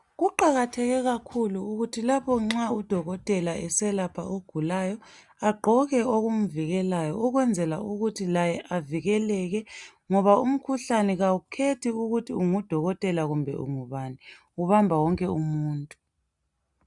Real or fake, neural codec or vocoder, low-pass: real; none; 10.8 kHz